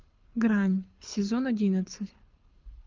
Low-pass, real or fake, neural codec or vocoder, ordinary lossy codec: 7.2 kHz; fake; codec, 24 kHz, 6 kbps, HILCodec; Opus, 24 kbps